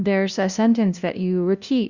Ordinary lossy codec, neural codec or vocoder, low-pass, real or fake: Opus, 64 kbps; codec, 16 kHz, 0.5 kbps, FunCodec, trained on LibriTTS, 25 frames a second; 7.2 kHz; fake